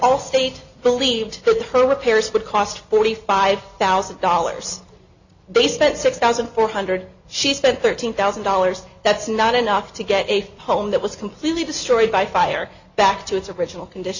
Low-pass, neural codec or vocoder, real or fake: 7.2 kHz; none; real